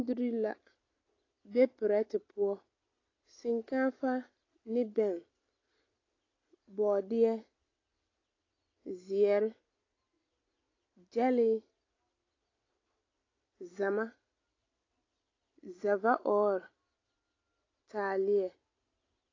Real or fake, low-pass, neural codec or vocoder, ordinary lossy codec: real; 7.2 kHz; none; AAC, 32 kbps